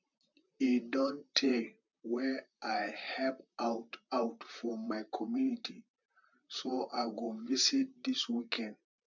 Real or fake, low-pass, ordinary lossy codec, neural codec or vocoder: fake; 7.2 kHz; none; vocoder, 44.1 kHz, 128 mel bands, Pupu-Vocoder